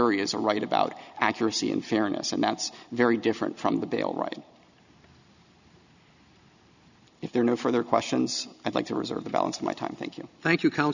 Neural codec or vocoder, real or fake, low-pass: none; real; 7.2 kHz